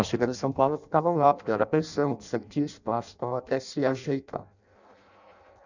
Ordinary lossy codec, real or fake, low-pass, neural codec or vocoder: none; fake; 7.2 kHz; codec, 16 kHz in and 24 kHz out, 0.6 kbps, FireRedTTS-2 codec